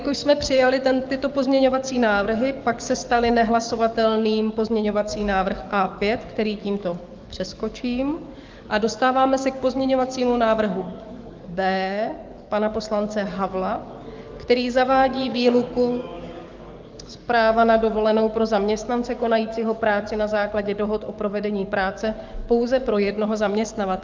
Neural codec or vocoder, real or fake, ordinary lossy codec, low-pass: codec, 44.1 kHz, 7.8 kbps, DAC; fake; Opus, 24 kbps; 7.2 kHz